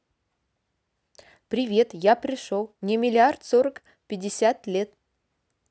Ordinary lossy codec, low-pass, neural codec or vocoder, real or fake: none; none; none; real